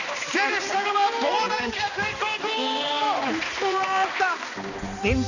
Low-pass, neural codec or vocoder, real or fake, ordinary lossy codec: 7.2 kHz; codec, 16 kHz, 2 kbps, X-Codec, HuBERT features, trained on balanced general audio; fake; none